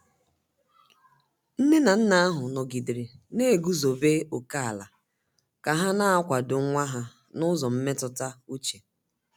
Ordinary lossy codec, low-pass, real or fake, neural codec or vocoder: none; none; real; none